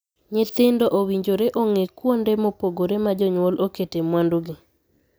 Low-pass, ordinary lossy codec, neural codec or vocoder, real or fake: none; none; none; real